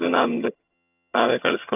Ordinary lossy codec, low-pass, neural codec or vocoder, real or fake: none; 3.6 kHz; vocoder, 22.05 kHz, 80 mel bands, HiFi-GAN; fake